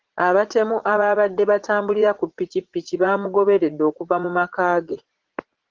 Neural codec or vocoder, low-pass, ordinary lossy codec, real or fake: vocoder, 44.1 kHz, 80 mel bands, Vocos; 7.2 kHz; Opus, 16 kbps; fake